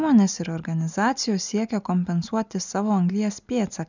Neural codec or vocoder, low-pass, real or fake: none; 7.2 kHz; real